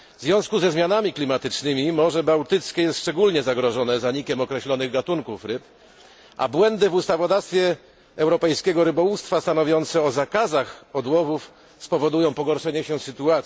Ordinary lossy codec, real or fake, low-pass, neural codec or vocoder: none; real; none; none